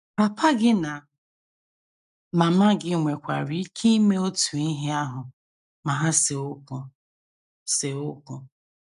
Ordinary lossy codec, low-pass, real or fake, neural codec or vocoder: none; 10.8 kHz; real; none